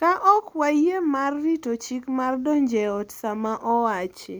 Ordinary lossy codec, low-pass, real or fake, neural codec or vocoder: none; none; real; none